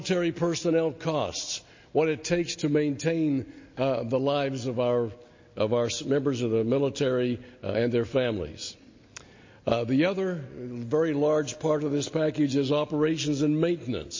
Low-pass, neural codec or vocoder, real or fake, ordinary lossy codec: 7.2 kHz; none; real; MP3, 32 kbps